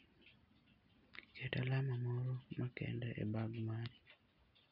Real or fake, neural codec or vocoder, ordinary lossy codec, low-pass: real; none; none; 5.4 kHz